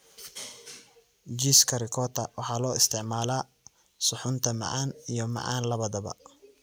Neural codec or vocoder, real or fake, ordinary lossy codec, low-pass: none; real; none; none